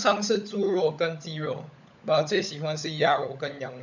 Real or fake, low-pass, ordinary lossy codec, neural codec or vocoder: fake; 7.2 kHz; none; codec, 16 kHz, 16 kbps, FunCodec, trained on LibriTTS, 50 frames a second